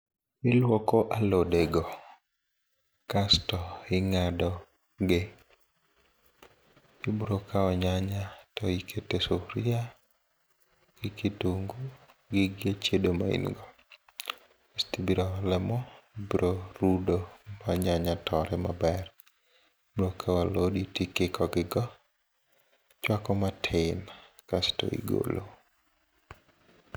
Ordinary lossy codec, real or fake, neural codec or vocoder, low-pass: none; real; none; none